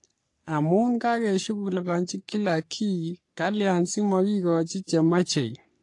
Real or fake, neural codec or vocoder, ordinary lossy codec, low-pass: fake; codec, 44.1 kHz, 3.4 kbps, Pupu-Codec; AAC, 48 kbps; 10.8 kHz